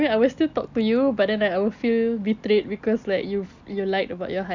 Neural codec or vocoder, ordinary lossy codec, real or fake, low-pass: none; none; real; 7.2 kHz